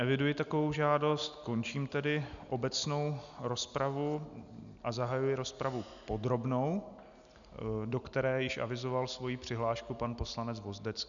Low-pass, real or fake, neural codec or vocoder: 7.2 kHz; real; none